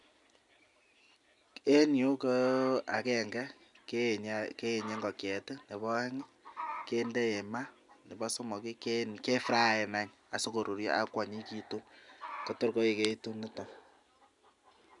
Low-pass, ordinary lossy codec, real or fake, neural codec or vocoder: 10.8 kHz; none; real; none